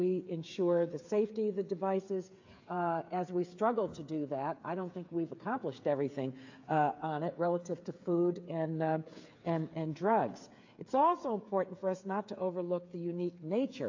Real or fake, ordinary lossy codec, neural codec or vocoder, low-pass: fake; AAC, 48 kbps; codec, 16 kHz, 8 kbps, FreqCodec, smaller model; 7.2 kHz